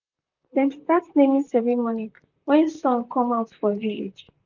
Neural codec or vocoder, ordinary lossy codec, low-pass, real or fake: vocoder, 44.1 kHz, 128 mel bands, Pupu-Vocoder; none; 7.2 kHz; fake